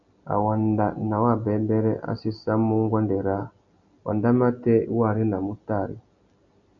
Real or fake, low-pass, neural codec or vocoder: real; 7.2 kHz; none